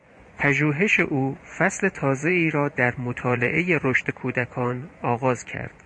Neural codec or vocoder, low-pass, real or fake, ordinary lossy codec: vocoder, 24 kHz, 100 mel bands, Vocos; 9.9 kHz; fake; MP3, 48 kbps